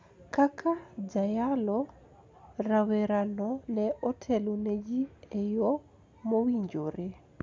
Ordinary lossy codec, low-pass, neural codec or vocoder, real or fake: none; none; none; real